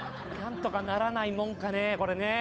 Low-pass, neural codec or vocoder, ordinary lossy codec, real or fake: none; codec, 16 kHz, 8 kbps, FunCodec, trained on Chinese and English, 25 frames a second; none; fake